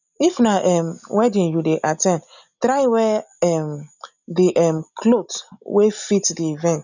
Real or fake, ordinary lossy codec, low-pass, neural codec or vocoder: real; none; 7.2 kHz; none